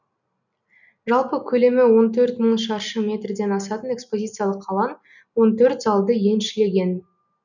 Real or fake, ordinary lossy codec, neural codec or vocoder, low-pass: real; none; none; 7.2 kHz